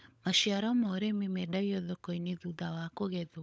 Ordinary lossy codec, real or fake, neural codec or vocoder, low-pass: none; fake; codec, 16 kHz, 16 kbps, FunCodec, trained on LibriTTS, 50 frames a second; none